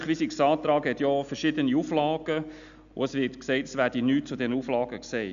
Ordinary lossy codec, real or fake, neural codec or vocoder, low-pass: none; real; none; 7.2 kHz